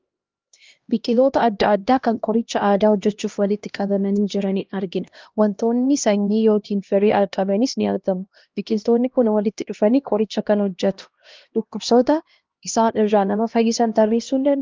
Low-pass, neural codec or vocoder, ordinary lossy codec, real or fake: 7.2 kHz; codec, 16 kHz, 1 kbps, X-Codec, HuBERT features, trained on LibriSpeech; Opus, 32 kbps; fake